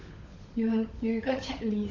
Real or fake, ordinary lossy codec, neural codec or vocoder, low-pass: fake; AAC, 48 kbps; codec, 16 kHz, 16 kbps, FunCodec, trained on LibriTTS, 50 frames a second; 7.2 kHz